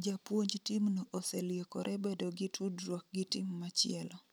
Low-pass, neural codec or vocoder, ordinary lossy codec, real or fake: none; none; none; real